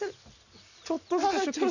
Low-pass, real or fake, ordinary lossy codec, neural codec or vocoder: 7.2 kHz; real; none; none